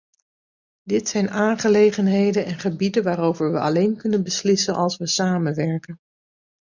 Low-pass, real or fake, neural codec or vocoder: 7.2 kHz; real; none